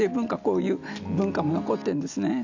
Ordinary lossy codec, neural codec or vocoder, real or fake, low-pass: none; none; real; 7.2 kHz